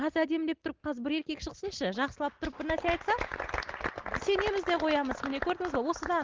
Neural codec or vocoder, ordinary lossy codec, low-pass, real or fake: none; Opus, 16 kbps; 7.2 kHz; real